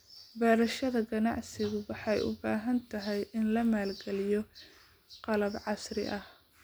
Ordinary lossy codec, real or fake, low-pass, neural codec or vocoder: none; real; none; none